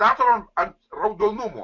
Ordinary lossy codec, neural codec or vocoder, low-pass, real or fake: MP3, 48 kbps; none; 7.2 kHz; real